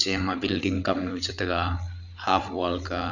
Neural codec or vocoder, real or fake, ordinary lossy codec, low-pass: codec, 16 kHz, 8 kbps, FreqCodec, larger model; fake; none; 7.2 kHz